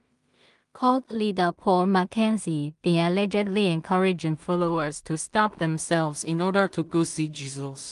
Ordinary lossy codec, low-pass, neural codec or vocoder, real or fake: Opus, 32 kbps; 10.8 kHz; codec, 16 kHz in and 24 kHz out, 0.4 kbps, LongCat-Audio-Codec, two codebook decoder; fake